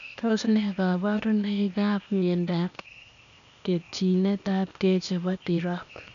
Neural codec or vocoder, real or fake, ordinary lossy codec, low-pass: codec, 16 kHz, 0.8 kbps, ZipCodec; fake; none; 7.2 kHz